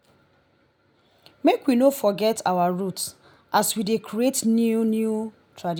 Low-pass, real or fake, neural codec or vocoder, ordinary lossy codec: none; real; none; none